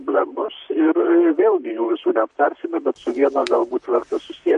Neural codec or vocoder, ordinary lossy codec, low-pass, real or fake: vocoder, 44.1 kHz, 128 mel bands, Pupu-Vocoder; MP3, 48 kbps; 14.4 kHz; fake